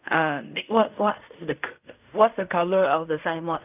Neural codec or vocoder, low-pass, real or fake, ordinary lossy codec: codec, 16 kHz in and 24 kHz out, 0.4 kbps, LongCat-Audio-Codec, fine tuned four codebook decoder; 3.6 kHz; fake; none